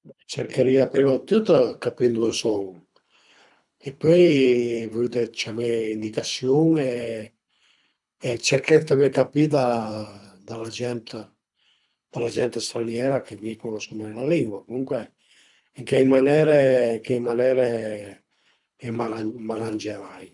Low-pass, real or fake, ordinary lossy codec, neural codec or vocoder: 10.8 kHz; fake; none; codec, 24 kHz, 3 kbps, HILCodec